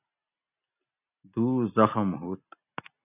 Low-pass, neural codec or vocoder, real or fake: 3.6 kHz; none; real